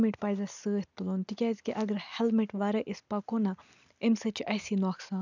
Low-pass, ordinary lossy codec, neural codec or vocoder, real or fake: 7.2 kHz; none; none; real